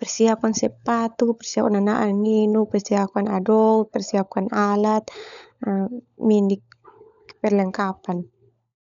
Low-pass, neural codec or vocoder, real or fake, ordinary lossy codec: 7.2 kHz; codec, 16 kHz, 16 kbps, FunCodec, trained on LibriTTS, 50 frames a second; fake; none